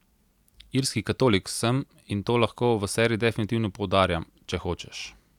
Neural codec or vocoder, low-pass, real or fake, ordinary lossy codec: none; 19.8 kHz; real; none